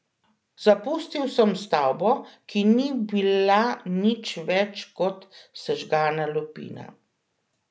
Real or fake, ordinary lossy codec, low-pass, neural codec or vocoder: real; none; none; none